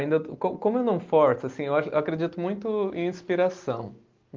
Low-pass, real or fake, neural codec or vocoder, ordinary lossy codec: 7.2 kHz; real; none; Opus, 24 kbps